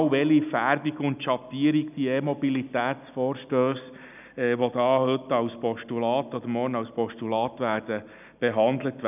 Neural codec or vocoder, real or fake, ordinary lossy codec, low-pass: none; real; none; 3.6 kHz